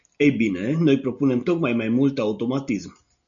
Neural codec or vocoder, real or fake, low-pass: none; real; 7.2 kHz